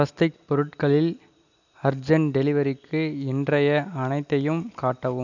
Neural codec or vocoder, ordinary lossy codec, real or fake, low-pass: none; none; real; 7.2 kHz